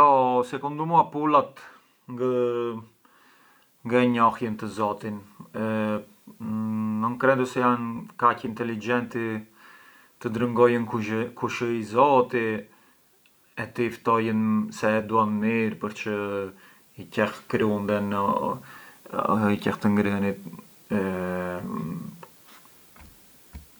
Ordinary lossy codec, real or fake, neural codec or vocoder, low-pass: none; real; none; none